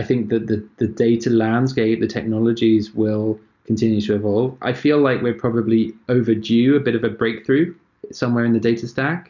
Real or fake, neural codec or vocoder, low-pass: real; none; 7.2 kHz